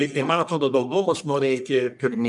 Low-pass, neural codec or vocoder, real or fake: 10.8 kHz; codec, 44.1 kHz, 1.7 kbps, Pupu-Codec; fake